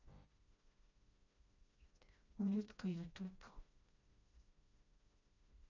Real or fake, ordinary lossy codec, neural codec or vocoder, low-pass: fake; none; codec, 16 kHz, 1 kbps, FreqCodec, smaller model; 7.2 kHz